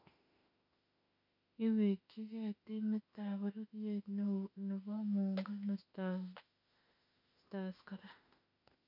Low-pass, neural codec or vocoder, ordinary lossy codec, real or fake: 5.4 kHz; autoencoder, 48 kHz, 32 numbers a frame, DAC-VAE, trained on Japanese speech; none; fake